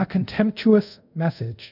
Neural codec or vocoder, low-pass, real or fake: codec, 24 kHz, 0.5 kbps, DualCodec; 5.4 kHz; fake